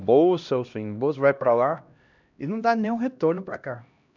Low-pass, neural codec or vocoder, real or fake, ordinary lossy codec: 7.2 kHz; codec, 16 kHz, 1 kbps, X-Codec, HuBERT features, trained on LibriSpeech; fake; none